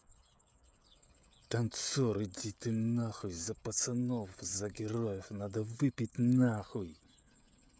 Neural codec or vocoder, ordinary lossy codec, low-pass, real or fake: codec, 16 kHz, 16 kbps, FreqCodec, smaller model; none; none; fake